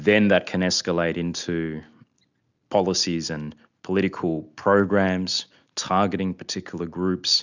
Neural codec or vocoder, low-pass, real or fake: none; 7.2 kHz; real